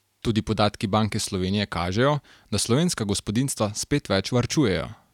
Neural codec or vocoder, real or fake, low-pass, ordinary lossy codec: none; real; 19.8 kHz; none